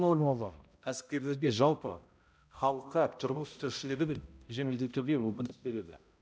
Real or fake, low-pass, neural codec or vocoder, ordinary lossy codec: fake; none; codec, 16 kHz, 0.5 kbps, X-Codec, HuBERT features, trained on balanced general audio; none